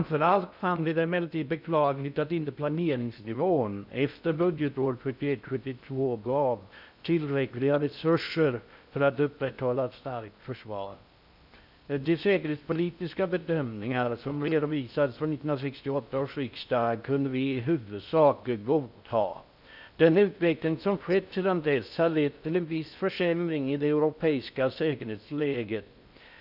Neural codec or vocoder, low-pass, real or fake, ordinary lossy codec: codec, 16 kHz in and 24 kHz out, 0.6 kbps, FocalCodec, streaming, 2048 codes; 5.4 kHz; fake; none